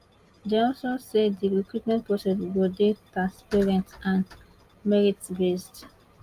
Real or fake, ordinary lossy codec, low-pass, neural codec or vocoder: real; Opus, 32 kbps; 14.4 kHz; none